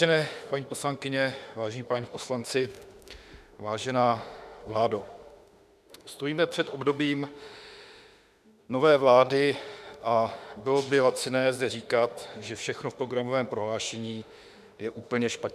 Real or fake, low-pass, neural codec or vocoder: fake; 14.4 kHz; autoencoder, 48 kHz, 32 numbers a frame, DAC-VAE, trained on Japanese speech